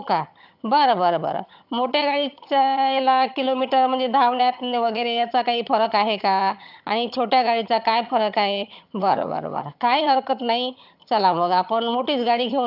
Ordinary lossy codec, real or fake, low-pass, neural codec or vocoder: none; fake; 5.4 kHz; vocoder, 22.05 kHz, 80 mel bands, HiFi-GAN